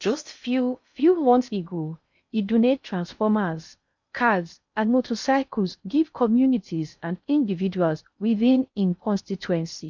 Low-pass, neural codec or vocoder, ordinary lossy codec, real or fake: 7.2 kHz; codec, 16 kHz in and 24 kHz out, 0.6 kbps, FocalCodec, streaming, 2048 codes; MP3, 64 kbps; fake